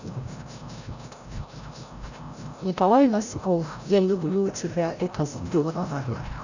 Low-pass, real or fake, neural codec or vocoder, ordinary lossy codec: 7.2 kHz; fake; codec, 16 kHz, 0.5 kbps, FreqCodec, larger model; none